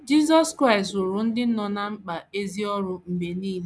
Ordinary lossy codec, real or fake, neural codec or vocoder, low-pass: none; real; none; none